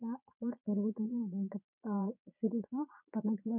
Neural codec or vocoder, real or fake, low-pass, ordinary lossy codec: vocoder, 22.05 kHz, 80 mel bands, Vocos; fake; 3.6 kHz; none